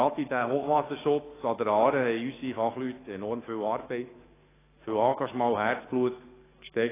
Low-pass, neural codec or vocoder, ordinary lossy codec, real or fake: 3.6 kHz; codec, 44.1 kHz, 7.8 kbps, DAC; AAC, 16 kbps; fake